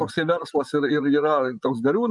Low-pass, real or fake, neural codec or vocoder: 10.8 kHz; fake; vocoder, 24 kHz, 100 mel bands, Vocos